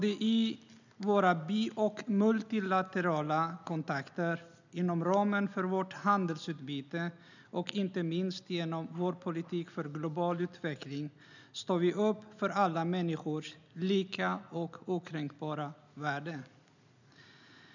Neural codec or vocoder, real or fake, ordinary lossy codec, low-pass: none; real; none; 7.2 kHz